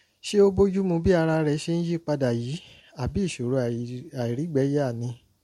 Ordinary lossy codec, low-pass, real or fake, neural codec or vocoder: MP3, 64 kbps; 19.8 kHz; real; none